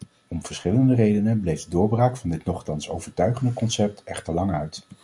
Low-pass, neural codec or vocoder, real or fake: 10.8 kHz; none; real